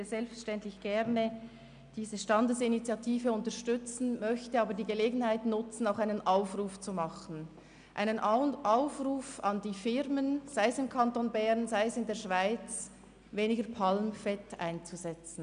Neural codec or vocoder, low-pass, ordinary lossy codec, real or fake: none; 9.9 kHz; none; real